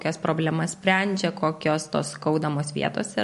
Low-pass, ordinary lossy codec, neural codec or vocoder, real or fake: 14.4 kHz; MP3, 48 kbps; none; real